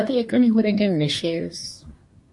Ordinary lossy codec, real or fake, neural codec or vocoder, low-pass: MP3, 48 kbps; fake; codec, 24 kHz, 1 kbps, SNAC; 10.8 kHz